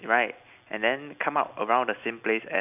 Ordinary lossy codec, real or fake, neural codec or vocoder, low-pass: none; real; none; 3.6 kHz